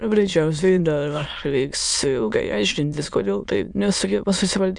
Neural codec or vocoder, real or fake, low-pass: autoencoder, 22.05 kHz, a latent of 192 numbers a frame, VITS, trained on many speakers; fake; 9.9 kHz